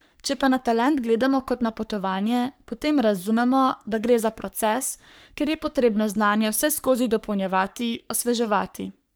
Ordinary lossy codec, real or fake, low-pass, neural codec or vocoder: none; fake; none; codec, 44.1 kHz, 3.4 kbps, Pupu-Codec